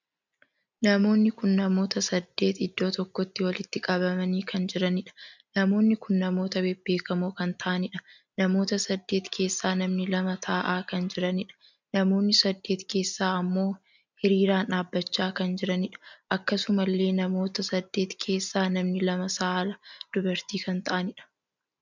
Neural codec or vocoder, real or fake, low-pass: none; real; 7.2 kHz